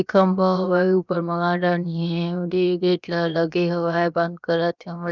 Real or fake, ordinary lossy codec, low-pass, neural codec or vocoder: fake; none; 7.2 kHz; codec, 16 kHz, 0.7 kbps, FocalCodec